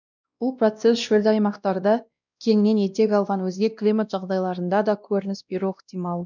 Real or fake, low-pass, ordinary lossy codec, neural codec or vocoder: fake; 7.2 kHz; none; codec, 16 kHz, 1 kbps, X-Codec, WavLM features, trained on Multilingual LibriSpeech